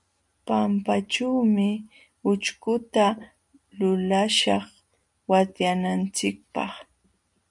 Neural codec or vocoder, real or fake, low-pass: none; real; 10.8 kHz